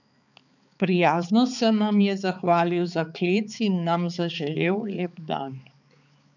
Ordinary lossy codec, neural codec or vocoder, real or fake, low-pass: none; codec, 16 kHz, 4 kbps, X-Codec, HuBERT features, trained on balanced general audio; fake; 7.2 kHz